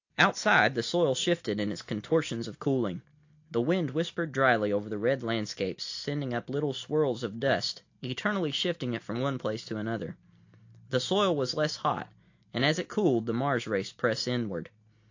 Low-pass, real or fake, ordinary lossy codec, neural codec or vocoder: 7.2 kHz; real; AAC, 48 kbps; none